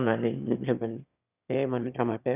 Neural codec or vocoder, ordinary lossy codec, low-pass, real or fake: autoencoder, 22.05 kHz, a latent of 192 numbers a frame, VITS, trained on one speaker; none; 3.6 kHz; fake